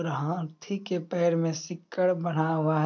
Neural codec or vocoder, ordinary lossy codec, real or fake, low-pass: none; none; real; 7.2 kHz